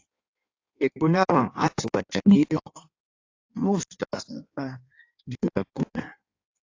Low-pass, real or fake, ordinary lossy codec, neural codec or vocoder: 7.2 kHz; fake; AAC, 48 kbps; codec, 16 kHz in and 24 kHz out, 1.1 kbps, FireRedTTS-2 codec